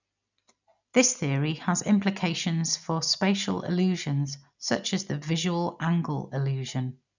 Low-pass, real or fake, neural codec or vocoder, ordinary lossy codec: 7.2 kHz; real; none; none